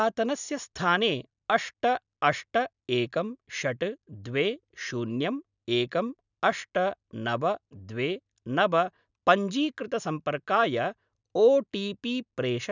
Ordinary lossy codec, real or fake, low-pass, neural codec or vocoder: none; real; 7.2 kHz; none